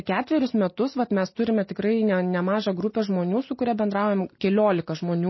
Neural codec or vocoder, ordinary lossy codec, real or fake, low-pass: none; MP3, 24 kbps; real; 7.2 kHz